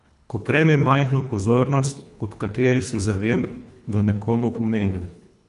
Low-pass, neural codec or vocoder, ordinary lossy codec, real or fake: 10.8 kHz; codec, 24 kHz, 1.5 kbps, HILCodec; none; fake